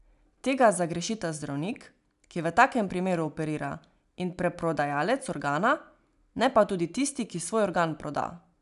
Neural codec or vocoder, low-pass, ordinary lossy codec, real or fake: none; 10.8 kHz; none; real